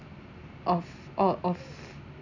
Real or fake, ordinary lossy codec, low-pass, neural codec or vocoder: real; none; 7.2 kHz; none